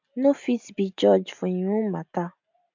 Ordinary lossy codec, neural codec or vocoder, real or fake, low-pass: MP3, 64 kbps; none; real; 7.2 kHz